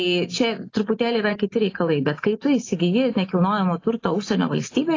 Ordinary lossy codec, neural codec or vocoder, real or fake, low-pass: AAC, 32 kbps; none; real; 7.2 kHz